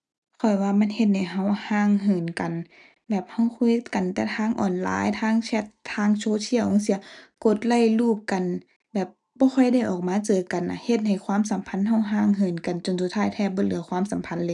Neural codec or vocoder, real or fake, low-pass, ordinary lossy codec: none; real; none; none